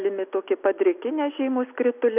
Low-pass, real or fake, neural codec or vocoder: 3.6 kHz; real; none